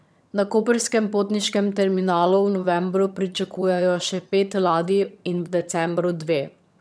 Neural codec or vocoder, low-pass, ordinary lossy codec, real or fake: vocoder, 22.05 kHz, 80 mel bands, HiFi-GAN; none; none; fake